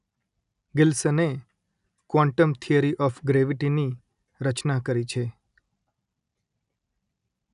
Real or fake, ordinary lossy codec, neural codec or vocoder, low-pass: real; none; none; 10.8 kHz